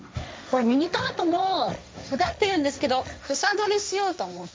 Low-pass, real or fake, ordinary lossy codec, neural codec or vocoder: none; fake; none; codec, 16 kHz, 1.1 kbps, Voila-Tokenizer